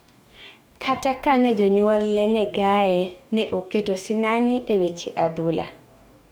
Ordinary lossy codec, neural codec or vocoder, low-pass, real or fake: none; codec, 44.1 kHz, 2.6 kbps, DAC; none; fake